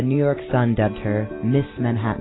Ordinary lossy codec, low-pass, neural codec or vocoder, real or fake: AAC, 16 kbps; 7.2 kHz; none; real